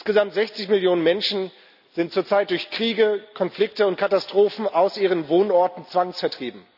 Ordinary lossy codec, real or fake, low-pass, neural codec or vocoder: none; real; 5.4 kHz; none